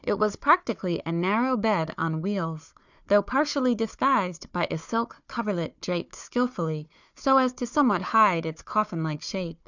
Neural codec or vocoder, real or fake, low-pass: codec, 16 kHz, 4 kbps, FunCodec, trained on Chinese and English, 50 frames a second; fake; 7.2 kHz